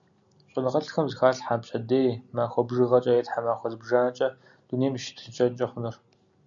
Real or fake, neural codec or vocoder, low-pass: real; none; 7.2 kHz